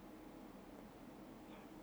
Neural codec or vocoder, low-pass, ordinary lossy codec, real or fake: none; none; none; real